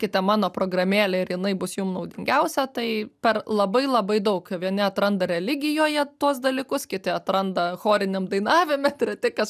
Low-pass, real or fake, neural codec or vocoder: 14.4 kHz; real; none